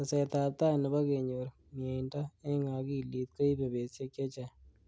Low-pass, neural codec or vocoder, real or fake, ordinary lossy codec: none; none; real; none